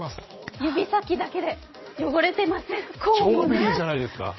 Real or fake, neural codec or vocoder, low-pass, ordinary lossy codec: fake; vocoder, 44.1 kHz, 80 mel bands, Vocos; 7.2 kHz; MP3, 24 kbps